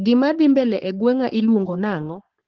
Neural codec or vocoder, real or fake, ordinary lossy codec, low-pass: codec, 44.1 kHz, 3.4 kbps, Pupu-Codec; fake; Opus, 16 kbps; 7.2 kHz